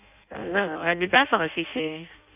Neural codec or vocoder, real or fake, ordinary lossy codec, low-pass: codec, 16 kHz in and 24 kHz out, 0.6 kbps, FireRedTTS-2 codec; fake; none; 3.6 kHz